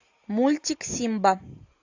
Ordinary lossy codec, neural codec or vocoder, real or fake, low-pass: AAC, 48 kbps; none; real; 7.2 kHz